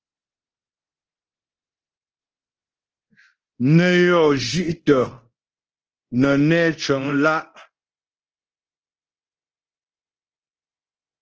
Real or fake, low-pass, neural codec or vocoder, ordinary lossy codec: fake; 7.2 kHz; codec, 24 kHz, 0.9 kbps, DualCodec; Opus, 16 kbps